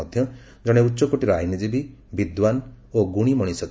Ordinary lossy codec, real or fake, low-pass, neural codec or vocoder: none; real; none; none